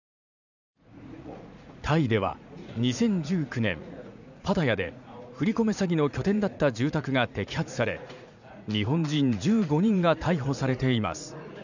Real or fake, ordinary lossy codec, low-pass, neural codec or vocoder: fake; none; 7.2 kHz; vocoder, 44.1 kHz, 80 mel bands, Vocos